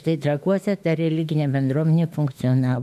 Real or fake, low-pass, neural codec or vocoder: fake; 14.4 kHz; autoencoder, 48 kHz, 32 numbers a frame, DAC-VAE, trained on Japanese speech